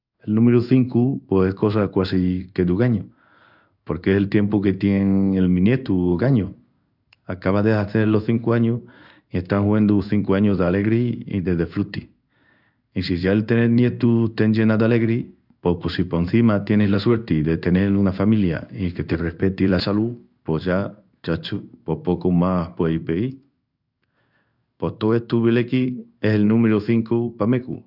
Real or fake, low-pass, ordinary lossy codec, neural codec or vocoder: fake; 5.4 kHz; none; codec, 16 kHz in and 24 kHz out, 1 kbps, XY-Tokenizer